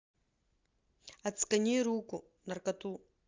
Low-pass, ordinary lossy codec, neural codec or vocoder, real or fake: 7.2 kHz; Opus, 32 kbps; none; real